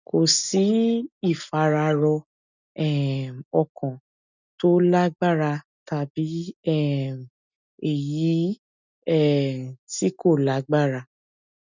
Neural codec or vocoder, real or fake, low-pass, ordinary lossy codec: none; real; 7.2 kHz; none